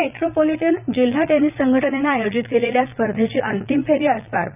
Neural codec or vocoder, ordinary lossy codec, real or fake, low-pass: vocoder, 22.05 kHz, 80 mel bands, Vocos; none; fake; 3.6 kHz